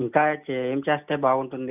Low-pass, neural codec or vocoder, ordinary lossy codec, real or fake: 3.6 kHz; none; none; real